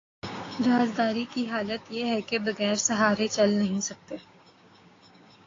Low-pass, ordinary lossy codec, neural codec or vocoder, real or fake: 7.2 kHz; AAC, 32 kbps; codec, 16 kHz, 6 kbps, DAC; fake